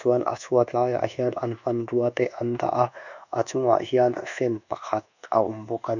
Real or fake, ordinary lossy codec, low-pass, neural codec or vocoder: fake; none; 7.2 kHz; codec, 24 kHz, 1.2 kbps, DualCodec